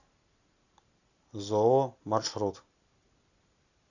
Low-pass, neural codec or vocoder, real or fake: 7.2 kHz; none; real